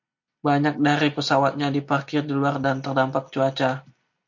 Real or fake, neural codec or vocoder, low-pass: real; none; 7.2 kHz